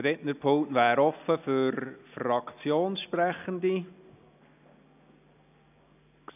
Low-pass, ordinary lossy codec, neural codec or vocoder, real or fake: 3.6 kHz; none; none; real